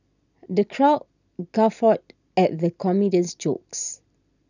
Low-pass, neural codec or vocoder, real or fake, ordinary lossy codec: 7.2 kHz; none; real; none